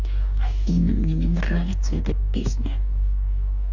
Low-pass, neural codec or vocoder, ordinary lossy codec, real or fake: 7.2 kHz; codec, 44.1 kHz, 2.6 kbps, DAC; none; fake